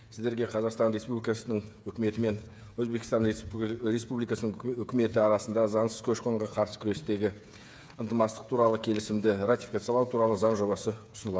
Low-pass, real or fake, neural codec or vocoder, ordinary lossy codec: none; fake; codec, 16 kHz, 8 kbps, FreqCodec, smaller model; none